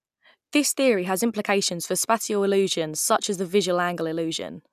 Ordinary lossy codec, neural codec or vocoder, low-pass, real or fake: none; none; 14.4 kHz; real